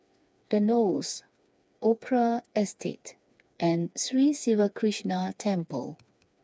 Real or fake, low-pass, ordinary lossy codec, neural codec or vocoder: fake; none; none; codec, 16 kHz, 4 kbps, FreqCodec, smaller model